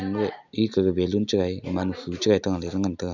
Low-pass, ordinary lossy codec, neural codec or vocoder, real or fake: 7.2 kHz; none; none; real